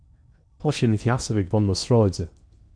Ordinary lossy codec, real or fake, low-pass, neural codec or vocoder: MP3, 96 kbps; fake; 9.9 kHz; codec, 16 kHz in and 24 kHz out, 0.8 kbps, FocalCodec, streaming, 65536 codes